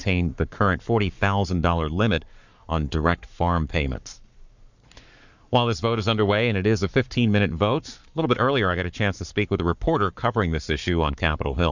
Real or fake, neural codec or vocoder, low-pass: fake; codec, 44.1 kHz, 7.8 kbps, Pupu-Codec; 7.2 kHz